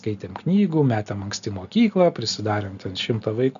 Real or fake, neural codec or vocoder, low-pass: real; none; 7.2 kHz